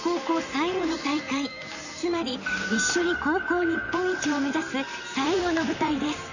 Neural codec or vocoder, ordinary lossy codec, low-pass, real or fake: vocoder, 44.1 kHz, 128 mel bands, Pupu-Vocoder; none; 7.2 kHz; fake